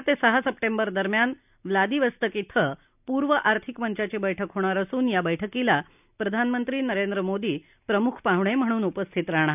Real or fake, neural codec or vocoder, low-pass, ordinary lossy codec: real; none; 3.6 kHz; none